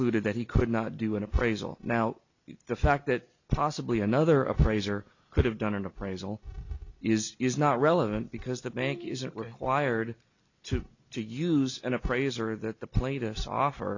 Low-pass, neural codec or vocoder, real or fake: 7.2 kHz; none; real